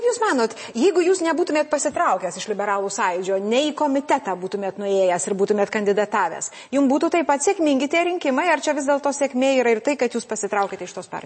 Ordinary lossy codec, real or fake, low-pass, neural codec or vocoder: MP3, 32 kbps; real; 9.9 kHz; none